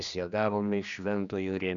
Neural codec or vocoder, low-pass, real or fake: codec, 16 kHz, 2 kbps, X-Codec, HuBERT features, trained on general audio; 7.2 kHz; fake